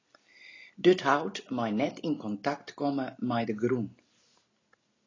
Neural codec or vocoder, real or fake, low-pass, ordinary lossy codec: none; real; 7.2 kHz; AAC, 32 kbps